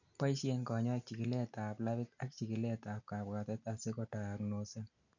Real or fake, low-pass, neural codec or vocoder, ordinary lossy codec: real; 7.2 kHz; none; none